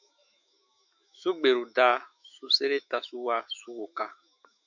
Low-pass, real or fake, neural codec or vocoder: 7.2 kHz; fake; autoencoder, 48 kHz, 128 numbers a frame, DAC-VAE, trained on Japanese speech